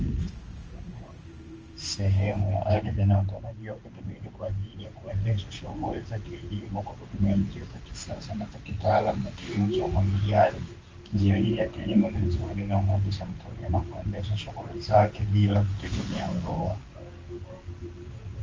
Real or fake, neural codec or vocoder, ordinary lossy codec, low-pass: fake; codec, 16 kHz in and 24 kHz out, 1 kbps, XY-Tokenizer; Opus, 24 kbps; 7.2 kHz